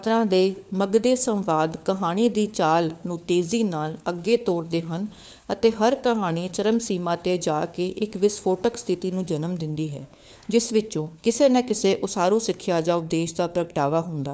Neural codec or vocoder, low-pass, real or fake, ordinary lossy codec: codec, 16 kHz, 4 kbps, FunCodec, trained on LibriTTS, 50 frames a second; none; fake; none